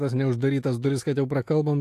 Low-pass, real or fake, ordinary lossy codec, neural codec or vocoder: 14.4 kHz; fake; AAC, 64 kbps; autoencoder, 48 kHz, 128 numbers a frame, DAC-VAE, trained on Japanese speech